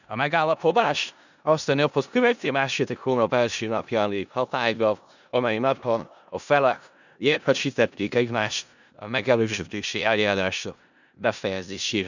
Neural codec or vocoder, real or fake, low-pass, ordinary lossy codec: codec, 16 kHz in and 24 kHz out, 0.4 kbps, LongCat-Audio-Codec, four codebook decoder; fake; 7.2 kHz; none